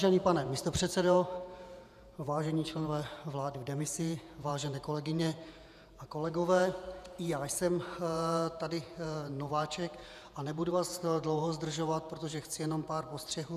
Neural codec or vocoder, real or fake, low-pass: vocoder, 48 kHz, 128 mel bands, Vocos; fake; 14.4 kHz